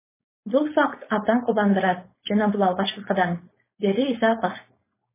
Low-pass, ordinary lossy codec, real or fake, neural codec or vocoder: 3.6 kHz; MP3, 16 kbps; fake; codec, 16 kHz, 4.8 kbps, FACodec